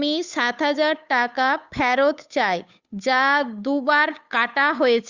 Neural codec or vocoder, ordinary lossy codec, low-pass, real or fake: codec, 16 kHz, 8 kbps, FunCodec, trained on Chinese and English, 25 frames a second; Opus, 64 kbps; 7.2 kHz; fake